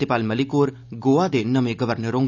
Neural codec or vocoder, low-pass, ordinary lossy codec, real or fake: none; 7.2 kHz; none; real